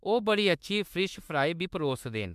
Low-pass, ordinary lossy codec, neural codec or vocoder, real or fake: 14.4 kHz; MP3, 96 kbps; autoencoder, 48 kHz, 32 numbers a frame, DAC-VAE, trained on Japanese speech; fake